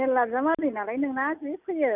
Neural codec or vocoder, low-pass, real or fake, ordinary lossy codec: none; 3.6 kHz; real; none